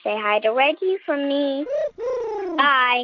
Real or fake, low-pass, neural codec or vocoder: real; 7.2 kHz; none